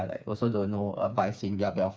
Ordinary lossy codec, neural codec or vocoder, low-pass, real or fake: none; codec, 16 kHz, 4 kbps, FreqCodec, smaller model; none; fake